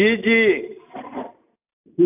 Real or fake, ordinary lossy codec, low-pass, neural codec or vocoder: real; AAC, 24 kbps; 3.6 kHz; none